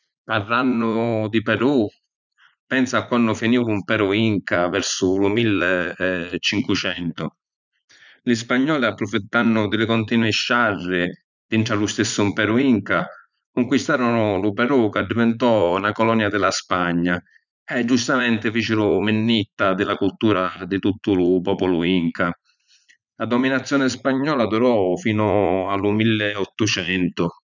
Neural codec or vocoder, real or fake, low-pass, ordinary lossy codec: vocoder, 44.1 kHz, 80 mel bands, Vocos; fake; 7.2 kHz; none